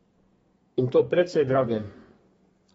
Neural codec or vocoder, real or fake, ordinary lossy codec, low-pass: codec, 32 kHz, 1.9 kbps, SNAC; fake; AAC, 24 kbps; 14.4 kHz